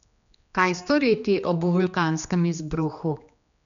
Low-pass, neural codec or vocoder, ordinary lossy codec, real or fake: 7.2 kHz; codec, 16 kHz, 2 kbps, X-Codec, HuBERT features, trained on general audio; none; fake